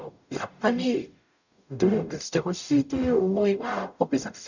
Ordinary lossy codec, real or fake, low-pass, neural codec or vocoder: none; fake; 7.2 kHz; codec, 44.1 kHz, 0.9 kbps, DAC